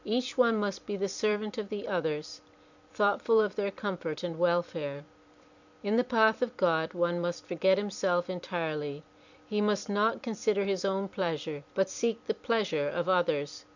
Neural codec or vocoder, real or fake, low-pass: vocoder, 44.1 kHz, 128 mel bands every 256 samples, BigVGAN v2; fake; 7.2 kHz